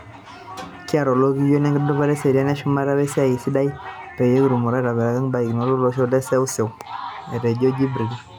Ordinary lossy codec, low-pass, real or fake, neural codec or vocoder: none; 19.8 kHz; real; none